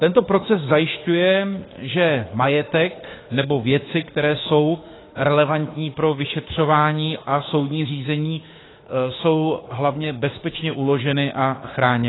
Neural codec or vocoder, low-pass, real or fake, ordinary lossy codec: autoencoder, 48 kHz, 32 numbers a frame, DAC-VAE, trained on Japanese speech; 7.2 kHz; fake; AAC, 16 kbps